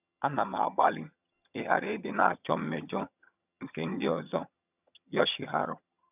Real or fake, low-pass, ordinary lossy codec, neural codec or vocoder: fake; 3.6 kHz; none; vocoder, 22.05 kHz, 80 mel bands, HiFi-GAN